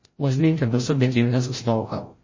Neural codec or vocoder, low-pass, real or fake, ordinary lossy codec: codec, 16 kHz, 0.5 kbps, FreqCodec, larger model; 7.2 kHz; fake; MP3, 32 kbps